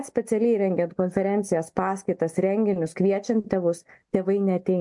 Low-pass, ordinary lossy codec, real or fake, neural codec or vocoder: 10.8 kHz; MP3, 64 kbps; real; none